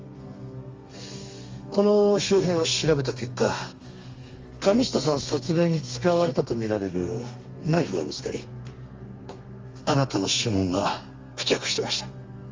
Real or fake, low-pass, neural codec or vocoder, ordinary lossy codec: fake; 7.2 kHz; codec, 32 kHz, 1.9 kbps, SNAC; Opus, 32 kbps